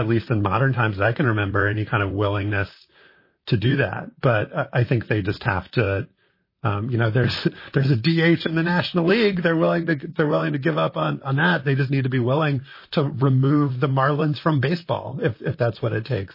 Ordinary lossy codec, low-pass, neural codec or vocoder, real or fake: MP3, 24 kbps; 5.4 kHz; vocoder, 44.1 kHz, 128 mel bands, Pupu-Vocoder; fake